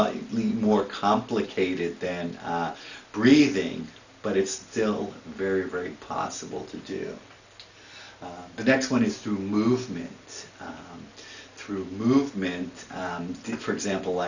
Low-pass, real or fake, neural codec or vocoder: 7.2 kHz; real; none